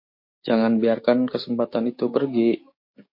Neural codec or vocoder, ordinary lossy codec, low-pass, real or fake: codec, 16 kHz, 6 kbps, DAC; MP3, 32 kbps; 5.4 kHz; fake